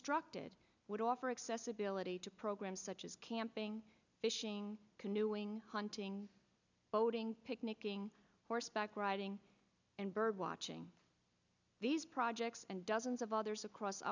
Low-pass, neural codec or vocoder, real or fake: 7.2 kHz; none; real